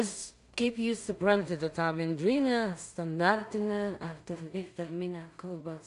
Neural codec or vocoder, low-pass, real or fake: codec, 16 kHz in and 24 kHz out, 0.4 kbps, LongCat-Audio-Codec, two codebook decoder; 10.8 kHz; fake